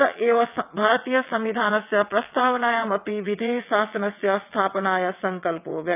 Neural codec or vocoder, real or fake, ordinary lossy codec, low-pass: vocoder, 22.05 kHz, 80 mel bands, WaveNeXt; fake; none; 3.6 kHz